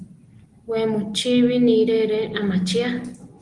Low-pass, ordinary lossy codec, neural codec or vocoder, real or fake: 10.8 kHz; Opus, 24 kbps; none; real